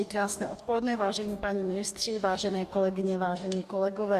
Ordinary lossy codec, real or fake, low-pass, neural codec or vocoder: Opus, 64 kbps; fake; 14.4 kHz; codec, 44.1 kHz, 2.6 kbps, DAC